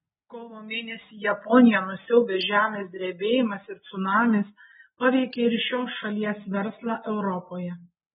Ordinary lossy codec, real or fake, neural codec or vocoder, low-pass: AAC, 16 kbps; real; none; 19.8 kHz